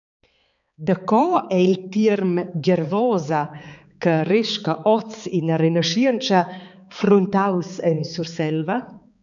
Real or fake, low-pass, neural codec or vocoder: fake; 7.2 kHz; codec, 16 kHz, 4 kbps, X-Codec, HuBERT features, trained on balanced general audio